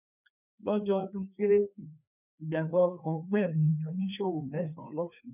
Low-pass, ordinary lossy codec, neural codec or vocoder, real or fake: 3.6 kHz; none; codec, 16 kHz, 2 kbps, FreqCodec, larger model; fake